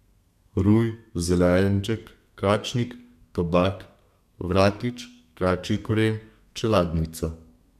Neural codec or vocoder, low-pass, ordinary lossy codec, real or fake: codec, 32 kHz, 1.9 kbps, SNAC; 14.4 kHz; none; fake